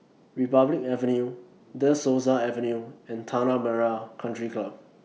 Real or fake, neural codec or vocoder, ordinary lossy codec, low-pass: real; none; none; none